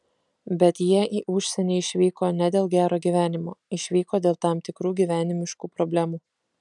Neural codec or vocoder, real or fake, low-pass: none; real; 10.8 kHz